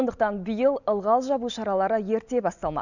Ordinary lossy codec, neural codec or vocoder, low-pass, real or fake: none; none; 7.2 kHz; real